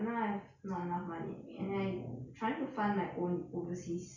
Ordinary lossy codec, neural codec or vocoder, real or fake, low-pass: none; none; real; 7.2 kHz